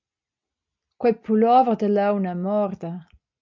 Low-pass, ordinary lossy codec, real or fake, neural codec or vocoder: 7.2 kHz; MP3, 64 kbps; real; none